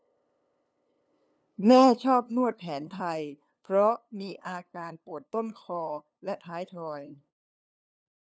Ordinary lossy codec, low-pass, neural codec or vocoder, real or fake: none; none; codec, 16 kHz, 2 kbps, FunCodec, trained on LibriTTS, 25 frames a second; fake